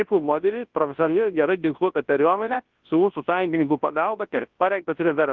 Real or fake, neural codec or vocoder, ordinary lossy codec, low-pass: fake; codec, 24 kHz, 0.9 kbps, WavTokenizer, large speech release; Opus, 16 kbps; 7.2 kHz